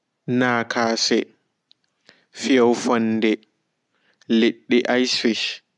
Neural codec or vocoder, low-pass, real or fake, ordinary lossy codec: none; 10.8 kHz; real; none